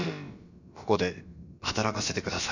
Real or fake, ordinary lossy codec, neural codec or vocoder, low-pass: fake; none; codec, 16 kHz, about 1 kbps, DyCAST, with the encoder's durations; 7.2 kHz